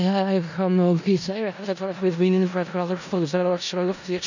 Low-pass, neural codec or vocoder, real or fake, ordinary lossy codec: 7.2 kHz; codec, 16 kHz in and 24 kHz out, 0.4 kbps, LongCat-Audio-Codec, four codebook decoder; fake; none